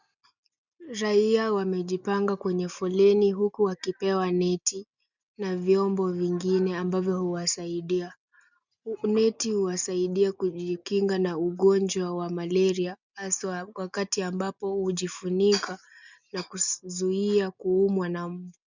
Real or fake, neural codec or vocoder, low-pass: real; none; 7.2 kHz